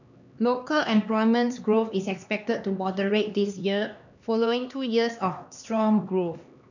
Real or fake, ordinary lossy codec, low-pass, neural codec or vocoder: fake; none; 7.2 kHz; codec, 16 kHz, 2 kbps, X-Codec, HuBERT features, trained on LibriSpeech